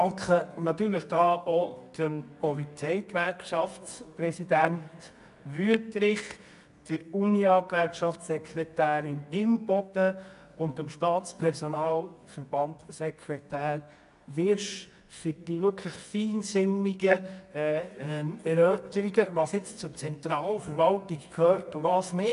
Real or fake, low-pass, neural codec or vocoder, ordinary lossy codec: fake; 10.8 kHz; codec, 24 kHz, 0.9 kbps, WavTokenizer, medium music audio release; AAC, 96 kbps